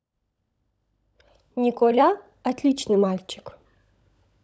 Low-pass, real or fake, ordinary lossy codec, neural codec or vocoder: none; fake; none; codec, 16 kHz, 16 kbps, FunCodec, trained on LibriTTS, 50 frames a second